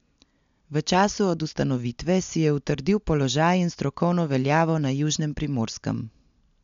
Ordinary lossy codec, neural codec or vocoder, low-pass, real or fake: MP3, 64 kbps; none; 7.2 kHz; real